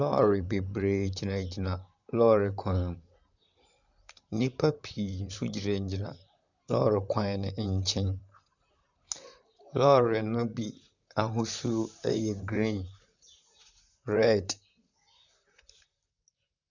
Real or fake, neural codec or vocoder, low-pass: fake; vocoder, 44.1 kHz, 128 mel bands, Pupu-Vocoder; 7.2 kHz